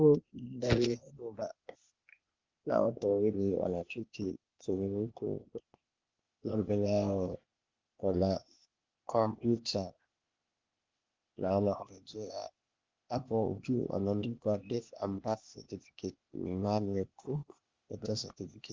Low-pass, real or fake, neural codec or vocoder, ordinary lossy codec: 7.2 kHz; fake; codec, 16 kHz, 0.8 kbps, ZipCodec; Opus, 32 kbps